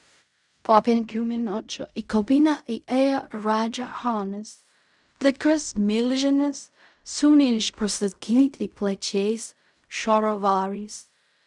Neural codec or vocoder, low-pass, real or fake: codec, 16 kHz in and 24 kHz out, 0.4 kbps, LongCat-Audio-Codec, fine tuned four codebook decoder; 10.8 kHz; fake